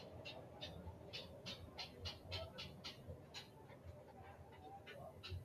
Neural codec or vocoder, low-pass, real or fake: none; 14.4 kHz; real